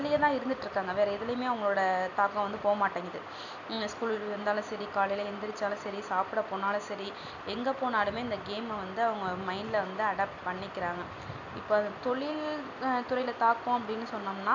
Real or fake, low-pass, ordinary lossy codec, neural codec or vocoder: real; 7.2 kHz; none; none